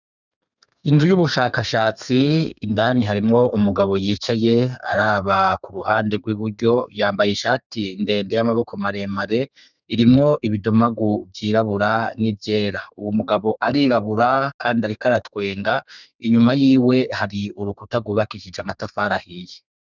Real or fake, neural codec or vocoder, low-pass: fake; codec, 32 kHz, 1.9 kbps, SNAC; 7.2 kHz